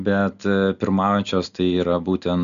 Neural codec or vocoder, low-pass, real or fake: none; 7.2 kHz; real